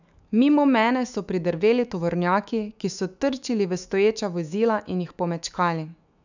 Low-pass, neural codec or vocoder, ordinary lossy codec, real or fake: 7.2 kHz; autoencoder, 48 kHz, 128 numbers a frame, DAC-VAE, trained on Japanese speech; none; fake